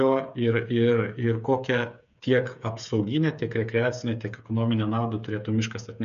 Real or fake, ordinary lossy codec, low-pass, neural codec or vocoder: fake; MP3, 96 kbps; 7.2 kHz; codec, 16 kHz, 8 kbps, FreqCodec, smaller model